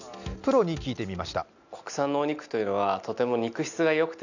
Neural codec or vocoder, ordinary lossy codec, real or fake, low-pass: none; none; real; 7.2 kHz